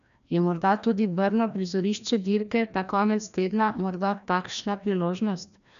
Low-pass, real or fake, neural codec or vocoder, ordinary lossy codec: 7.2 kHz; fake; codec, 16 kHz, 1 kbps, FreqCodec, larger model; none